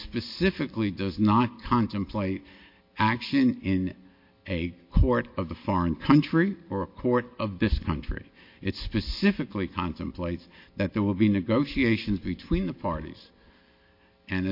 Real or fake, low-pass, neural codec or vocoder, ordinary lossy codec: real; 5.4 kHz; none; MP3, 32 kbps